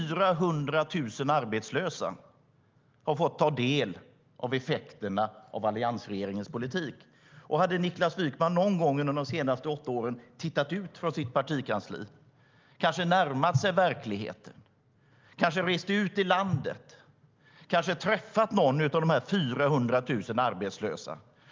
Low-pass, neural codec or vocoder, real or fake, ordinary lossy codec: 7.2 kHz; none; real; Opus, 24 kbps